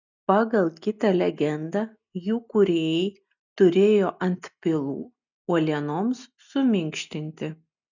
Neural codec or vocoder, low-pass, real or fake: none; 7.2 kHz; real